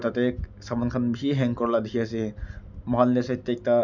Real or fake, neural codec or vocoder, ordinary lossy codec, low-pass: real; none; none; 7.2 kHz